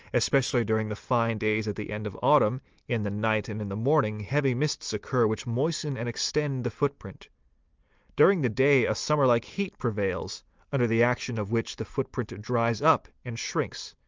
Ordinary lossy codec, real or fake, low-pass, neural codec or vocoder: Opus, 24 kbps; real; 7.2 kHz; none